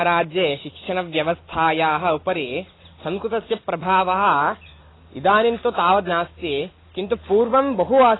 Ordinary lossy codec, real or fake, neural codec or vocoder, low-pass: AAC, 16 kbps; real; none; 7.2 kHz